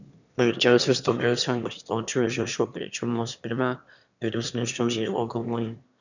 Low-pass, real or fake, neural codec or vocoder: 7.2 kHz; fake; autoencoder, 22.05 kHz, a latent of 192 numbers a frame, VITS, trained on one speaker